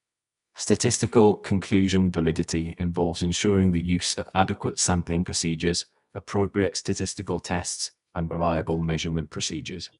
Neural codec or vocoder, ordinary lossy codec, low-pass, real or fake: codec, 24 kHz, 0.9 kbps, WavTokenizer, medium music audio release; none; 10.8 kHz; fake